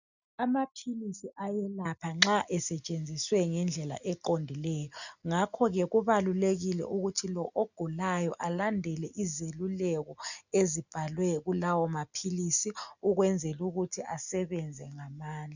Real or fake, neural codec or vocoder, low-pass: real; none; 7.2 kHz